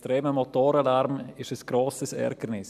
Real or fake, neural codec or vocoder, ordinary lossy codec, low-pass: real; none; none; 14.4 kHz